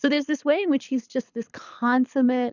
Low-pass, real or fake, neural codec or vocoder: 7.2 kHz; fake; codec, 24 kHz, 6 kbps, HILCodec